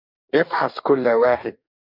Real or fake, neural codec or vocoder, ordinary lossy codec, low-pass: fake; codec, 44.1 kHz, 2.6 kbps, DAC; MP3, 48 kbps; 5.4 kHz